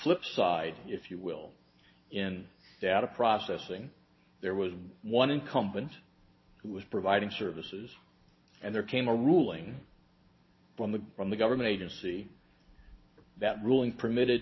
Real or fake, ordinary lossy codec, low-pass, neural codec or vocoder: real; MP3, 24 kbps; 7.2 kHz; none